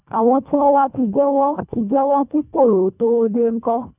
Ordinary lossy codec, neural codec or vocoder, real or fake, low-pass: none; codec, 24 kHz, 1.5 kbps, HILCodec; fake; 3.6 kHz